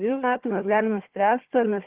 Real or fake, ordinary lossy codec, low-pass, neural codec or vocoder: fake; Opus, 16 kbps; 3.6 kHz; codec, 16 kHz, 4 kbps, FunCodec, trained on Chinese and English, 50 frames a second